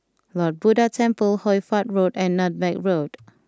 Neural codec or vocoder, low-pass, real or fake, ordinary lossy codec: none; none; real; none